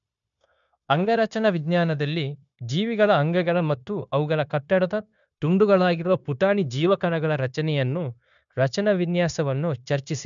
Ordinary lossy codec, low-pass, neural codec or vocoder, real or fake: MP3, 96 kbps; 7.2 kHz; codec, 16 kHz, 0.9 kbps, LongCat-Audio-Codec; fake